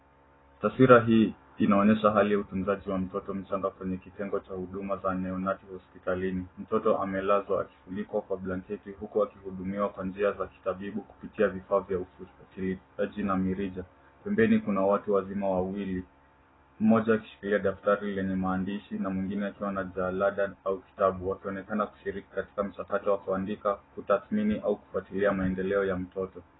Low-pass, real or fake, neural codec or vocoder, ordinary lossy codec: 7.2 kHz; real; none; AAC, 16 kbps